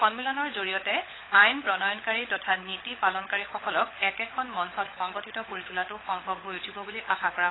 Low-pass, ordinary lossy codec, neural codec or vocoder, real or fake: 7.2 kHz; AAC, 16 kbps; codec, 16 kHz, 16 kbps, FunCodec, trained on LibriTTS, 50 frames a second; fake